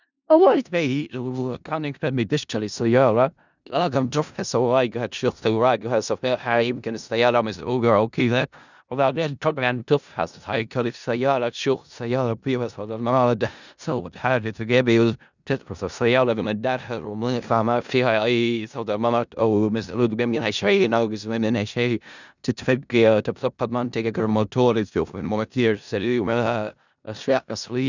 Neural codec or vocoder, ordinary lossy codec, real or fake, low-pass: codec, 16 kHz in and 24 kHz out, 0.4 kbps, LongCat-Audio-Codec, four codebook decoder; none; fake; 7.2 kHz